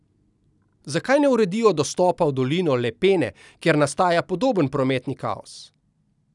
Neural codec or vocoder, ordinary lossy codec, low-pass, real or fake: none; none; 10.8 kHz; real